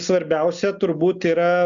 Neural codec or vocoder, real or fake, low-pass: none; real; 7.2 kHz